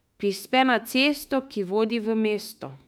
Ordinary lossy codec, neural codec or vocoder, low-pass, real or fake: none; autoencoder, 48 kHz, 32 numbers a frame, DAC-VAE, trained on Japanese speech; 19.8 kHz; fake